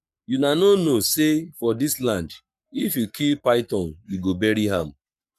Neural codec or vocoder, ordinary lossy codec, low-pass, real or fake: codec, 44.1 kHz, 7.8 kbps, Pupu-Codec; MP3, 96 kbps; 14.4 kHz; fake